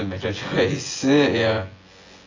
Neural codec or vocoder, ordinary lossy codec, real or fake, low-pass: vocoder, 24 kHz, 100 mel bands, Vocos; AAC, 32 kbps; fake; 7.2 kHz